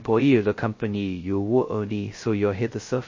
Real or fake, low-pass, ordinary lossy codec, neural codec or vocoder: fake; 7.2 kHz; MP3, 32 kbps; codec, 16 kHz, 0.2 kbps, FocalCodec